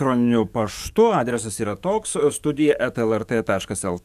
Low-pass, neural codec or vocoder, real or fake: 14.4 kHz; codec, 44.1 kHz, 7.8 kbps, DAC; fake